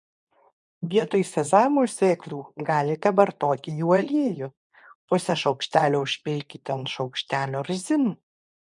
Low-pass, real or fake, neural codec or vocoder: 10.8 kHz; fake; codec, 24 kHz, 0.9 kbps, WavTokenizer, medium speech release version 2